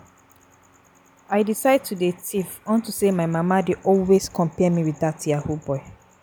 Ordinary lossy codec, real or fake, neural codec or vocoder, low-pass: none; real; none; none